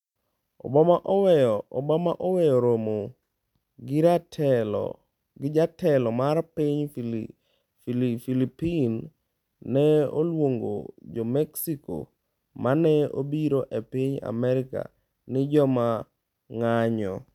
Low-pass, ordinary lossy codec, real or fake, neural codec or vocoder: 19.8 kHz; none; real; none